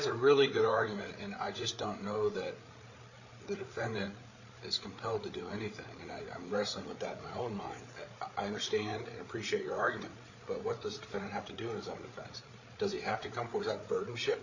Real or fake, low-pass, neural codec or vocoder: fake; 7.2 kHz; codec, 16 kHz, 8 kbps, FreqCodec, larger model